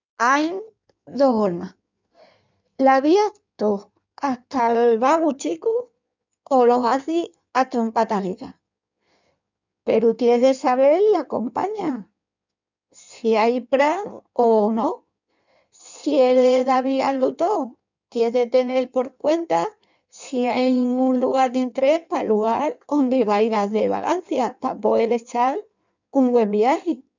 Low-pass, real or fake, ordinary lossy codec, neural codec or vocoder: 7.2 kHz; fake; none; codec, 16 kHz in and 24 kHz out, 1.1 kbps, FireRedTTS-2 codec